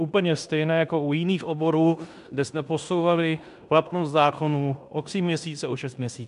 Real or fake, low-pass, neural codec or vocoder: fake; 10.8 kHz; codec, 16 kHz in and 24 kHz out, 0.9 kbps, LongCat-Audio-Codec, fine tuned four codebook decoder